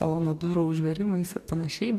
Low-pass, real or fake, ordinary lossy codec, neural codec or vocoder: 14.4 kHz; fake; MP3, 96 kbps; codec, 44.1 kHz, 2.6 kbps, DAC